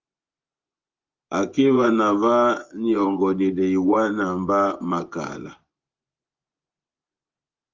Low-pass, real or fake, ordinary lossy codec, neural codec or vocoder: 7.2 kHz; fake; Opus, 32 kbps; vocoder, 24 kHz, 100 mel bands, Vocos